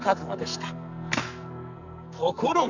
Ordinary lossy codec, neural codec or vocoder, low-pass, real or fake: none; codec, 44.1 kHz, 2.6 kbps, SNAC; 7.2 kHz; fake